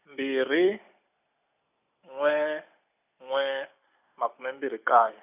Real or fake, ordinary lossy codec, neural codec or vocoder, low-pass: real; none; none; 3.6 kHz